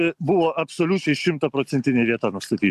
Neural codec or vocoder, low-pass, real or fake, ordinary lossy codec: none; 14.4 kHz; real; AAC, 96 kbps